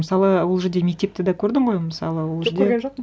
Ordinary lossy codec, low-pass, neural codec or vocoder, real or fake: none; none; none; real